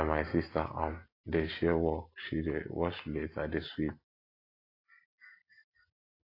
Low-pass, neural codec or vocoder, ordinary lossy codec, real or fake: 5.4 kHz; none; AAC, 32 kbps; real